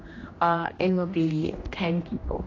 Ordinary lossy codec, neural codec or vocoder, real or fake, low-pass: AAC, 32 kbps; codec, 16 kHz, 1 kbps, X-Codec, HuBERT features, trained on general audio; fake; 7.2 kHz